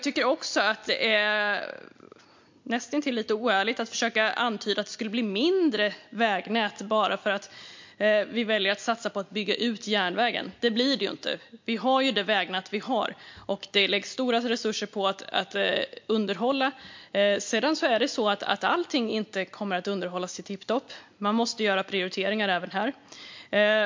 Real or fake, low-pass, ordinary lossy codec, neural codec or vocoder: real; 7.2 kHz; MP3, 48 kbps; none